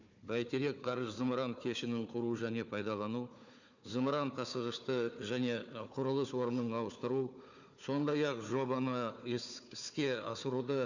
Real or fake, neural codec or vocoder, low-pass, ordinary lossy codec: fake; codec, 16 kHz, 4 kbps, FunCodec, trained on Chinese and English, 50 frames a second; 7.2 kHz; none